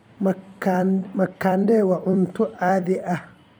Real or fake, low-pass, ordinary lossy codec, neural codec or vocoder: fake; none; none; vocoder, 44.1 kHz, 128 mel bands every 256 samples, BigVGAN v2